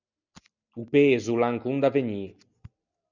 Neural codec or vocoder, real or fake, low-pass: none; real; 7.2 kHz